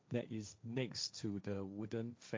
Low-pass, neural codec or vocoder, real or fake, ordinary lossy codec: none; codec, 16 kHz, 1.1 kbps, Voila-Tokenizer; fake; none